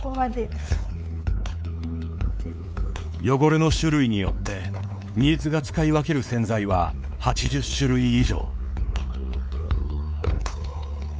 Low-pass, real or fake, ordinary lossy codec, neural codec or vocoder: none; fake; none; codec, 16 kHz, 4 kbps, X-Codec, WavLM features, trained on Multilingual LibriSpeech